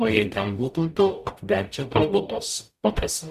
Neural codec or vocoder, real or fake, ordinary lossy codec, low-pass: codec, 44.1 kHz, 0.9 kbps, DAC; fake; Opus, 64 kbps; 14.4 kHz